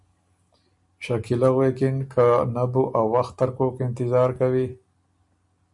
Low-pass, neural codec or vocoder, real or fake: 10.8 kHz; none; real